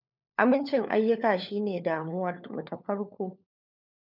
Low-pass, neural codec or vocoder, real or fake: 5.4 kHz; codec, 16 kHz, 4 kbps, FunCodec, trained on LibriTTS, 50 frames a second; fake